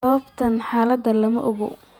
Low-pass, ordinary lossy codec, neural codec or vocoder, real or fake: 19.8 kHz; none; vocoder, 48 kHz, 128 mel bands, Vocos; fake